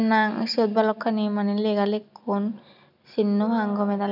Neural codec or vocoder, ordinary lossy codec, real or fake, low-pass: none; none; real; 5.4 kHz